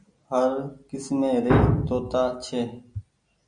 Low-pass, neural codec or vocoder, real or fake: 9.9 kHz; none; real